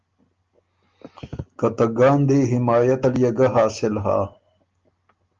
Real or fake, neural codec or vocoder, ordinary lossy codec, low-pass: real; none; Opus, 32 kbps; 7.2 kHz